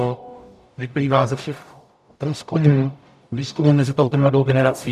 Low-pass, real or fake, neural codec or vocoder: 14.4 kHz; fake; codec, 44.1 kHz, 0.9 kbps, DAC